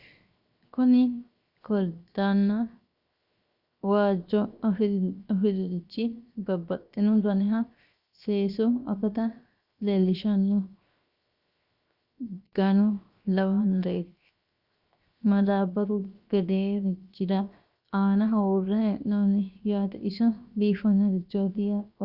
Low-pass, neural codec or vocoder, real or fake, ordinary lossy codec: 5.4 kHz; codec, 16 kHz, 0.7 kbps, FocalCodec; fake; Opus, 64 kbps